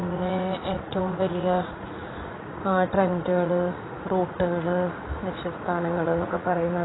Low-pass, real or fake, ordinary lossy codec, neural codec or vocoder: 7.2 kHz; fake; AAC, 16 kbps; vocoder, 44.1 kHz, 80 mel bands, Vocos